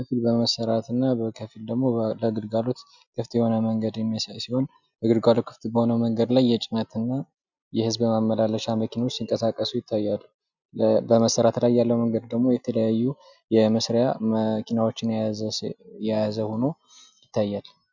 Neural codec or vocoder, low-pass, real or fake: none; 7.2 kHz; real